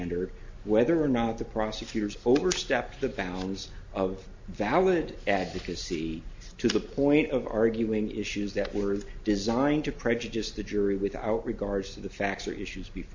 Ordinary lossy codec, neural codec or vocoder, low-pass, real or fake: MP3, 48 kbps; none; 7.2 kHz; real